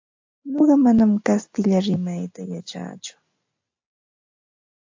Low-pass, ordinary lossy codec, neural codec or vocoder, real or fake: 7.2 kHz; AAC, 48 kbps; none; real